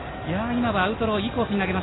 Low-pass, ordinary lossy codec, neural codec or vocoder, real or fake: 7.2 kHz; AAC, 16 kbps; none; real